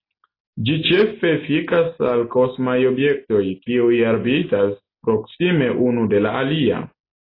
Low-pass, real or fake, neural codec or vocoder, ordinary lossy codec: 5.4 kHz; real; none; AAC, 24 kbps